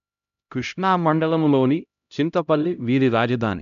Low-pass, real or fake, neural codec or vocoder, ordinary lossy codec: 7.2 kHz; fake; codec, 16 kHz, 0.5 kbps, X-Codec, HuBERT features, trained on LibriSpeech; AAC, 96 kbps